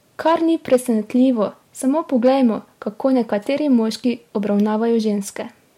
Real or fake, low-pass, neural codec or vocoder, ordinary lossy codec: real; 19.8 kHz; none; MP3, 64 kbps